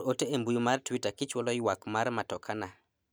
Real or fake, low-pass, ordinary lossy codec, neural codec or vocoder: real; none; none; none